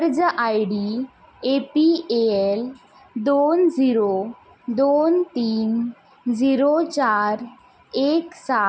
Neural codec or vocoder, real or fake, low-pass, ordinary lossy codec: none; real; none; none